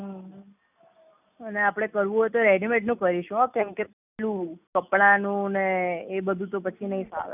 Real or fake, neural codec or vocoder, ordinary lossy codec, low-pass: real; none; none; 3.6 kHz